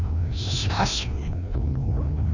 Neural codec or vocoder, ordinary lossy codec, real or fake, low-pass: codec, 16 kHz, 1 kbps, FreqCodec, larger model; none; fake; 7.2 kHz